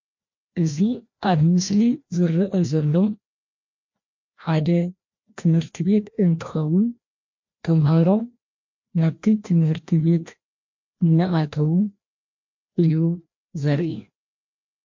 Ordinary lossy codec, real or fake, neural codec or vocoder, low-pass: MP3, 48 kbps; fake; codec, 16 kHz, 1 kbps, FreqCodec, larger model; 7.2 kHz